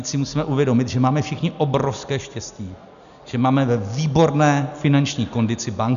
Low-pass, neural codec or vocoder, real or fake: 7.2 kHz; none; real